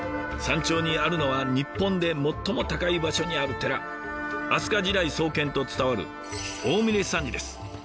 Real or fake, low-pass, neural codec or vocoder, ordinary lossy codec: real; none; none; none